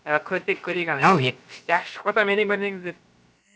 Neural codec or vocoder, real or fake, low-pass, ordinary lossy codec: codec, 16 kHz, about 1 kbps, DyCAST, with the encoder's durations; fake; none; none